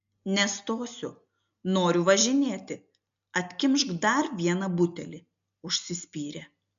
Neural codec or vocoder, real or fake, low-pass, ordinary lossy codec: none; real; 7.2 kHz; MP3, 64 kbps